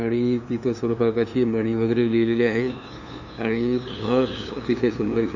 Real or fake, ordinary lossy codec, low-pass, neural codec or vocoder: fake; none; 7.2 kHz; codec, 16 kHz, 2 kbps, FunCodec, trained on LibriTTS, 25 frames a second